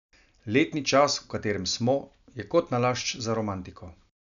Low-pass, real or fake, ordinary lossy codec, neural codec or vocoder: 7.2 kHz; real; none; none